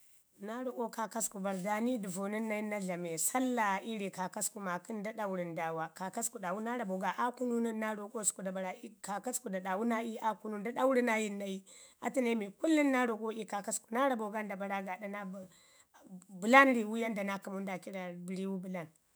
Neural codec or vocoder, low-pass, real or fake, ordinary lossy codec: autoencoder, 48 kHz, 128 numbers a frame, DAC-VAE, trained on Japanese speech; none; fake; none